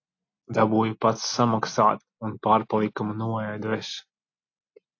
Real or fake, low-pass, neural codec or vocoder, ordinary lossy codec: real; 7.2 kHz; none; AAC, 48 kbps